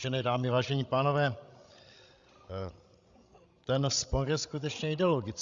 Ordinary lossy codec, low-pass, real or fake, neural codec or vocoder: Opus, 64 kbps; 7.2 kHz; fake; codec, 16 kHz, 16 kbps, FreqCodec, larger model